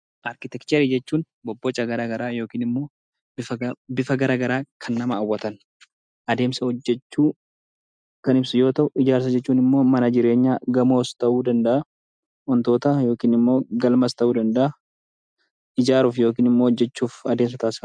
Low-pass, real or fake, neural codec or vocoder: 9.9 kHz; real; none